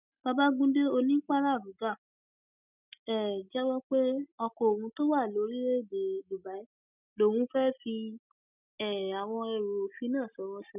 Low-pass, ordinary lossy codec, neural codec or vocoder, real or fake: 3.6 kHz; none; none; real